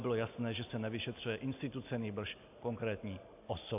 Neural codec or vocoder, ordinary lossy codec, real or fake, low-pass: none; AAC, 32 kbps; real; 3.6 kHz